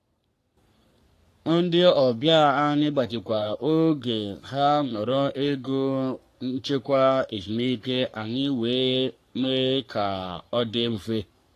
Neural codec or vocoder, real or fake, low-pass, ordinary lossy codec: codec, 44.1 kHz, 3.4 kbps, Pupu-Codec; fake; 14.4 kHz; AAC, 64 kbps